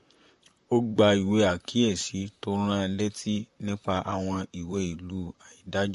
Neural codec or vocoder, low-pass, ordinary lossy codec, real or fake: vocoder, 44.1 kHz, 128 mel bands, Pupu-Vocoder; 14.4 kHz; MP3, 48 kbps; fake